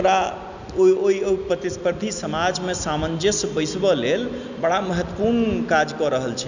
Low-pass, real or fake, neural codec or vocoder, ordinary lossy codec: 7.2 kHz; real; none; none